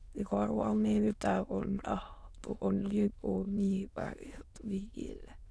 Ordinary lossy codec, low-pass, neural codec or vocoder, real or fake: none; none; autoencoder, 22.05 kHz, a latent of 192 numbers a frame, VITS, trained on many speakers; fake